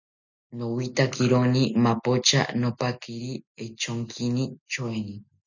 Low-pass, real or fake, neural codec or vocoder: 7.2 kHz; real; none